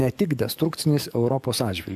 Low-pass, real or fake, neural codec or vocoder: 14.4 kHz; fake; codec, 44.1 kHz, 7.8 kbps, DAC